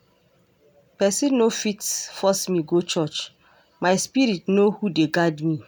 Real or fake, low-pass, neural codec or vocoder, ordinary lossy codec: real; none; none; none